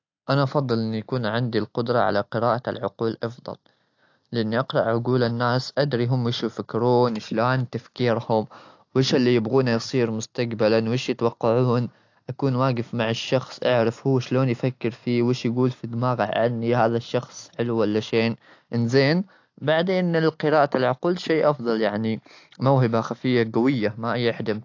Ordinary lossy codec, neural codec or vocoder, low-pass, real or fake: AAC, 48 kbps; none; 7.2 kHz; real